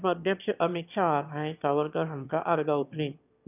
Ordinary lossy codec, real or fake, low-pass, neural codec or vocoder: none; fake; 3.6 kHz; autoencoder, 22.05 kHz, a latent of 192 numbers a frame, VITS, trained on one speaker